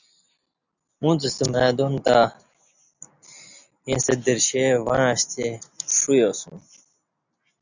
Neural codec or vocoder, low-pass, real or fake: none; 7.2 kHz; real